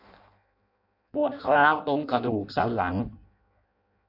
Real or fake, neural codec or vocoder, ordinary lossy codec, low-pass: fake; codec, 16 kHz in and 24 kHz out, 0.6 kbps, FireRedTTS-2 codec; none; 5.4 kHz